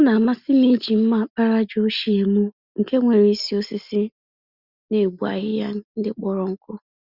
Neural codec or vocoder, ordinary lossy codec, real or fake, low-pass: none; Opus, 64 kbps; real; 5.4 kHz